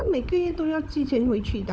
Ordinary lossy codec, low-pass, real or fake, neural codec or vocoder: none; none; fake; codec, 16 kHz, 16 kbps, FunCodec, trained on LibriTTS, 50 frames a second